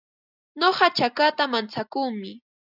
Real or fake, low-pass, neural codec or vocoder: real; 5.4 kHz; none